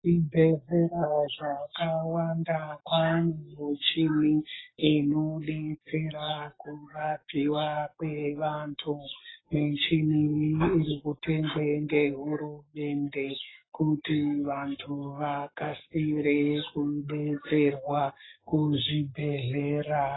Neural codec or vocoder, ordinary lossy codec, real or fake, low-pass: codec, 44.1 kHz, 7.8 kbps, Pupu-Codec; AAC, 16 kbps; fake; 7.2 kHz